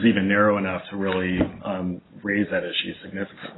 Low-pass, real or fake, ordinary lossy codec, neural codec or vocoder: 7.2 kHz; real; AAC, 16 kbps; none